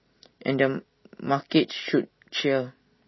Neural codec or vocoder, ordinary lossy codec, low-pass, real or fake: none; MP3, 24 kbps; 7.2 kHz; real